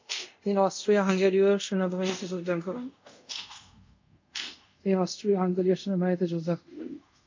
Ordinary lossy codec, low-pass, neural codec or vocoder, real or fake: MP3, 48 kbps; 7.2 kHz; codec, 24 kHz, 0.5 kbps, DualCodec; fake